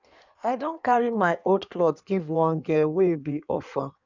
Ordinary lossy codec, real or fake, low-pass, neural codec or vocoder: none; fake; 7.2 kHz; codec, 16 kHz in and 24 kHz out, 1.1 kbps, FireRedTTS-2 codec